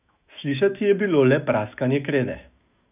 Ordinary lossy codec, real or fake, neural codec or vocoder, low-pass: none; fake; autoencoder, 48 kHz, 128 numbers a frame, DAC-VAE, trained on Japanese speech; 3.6 kHz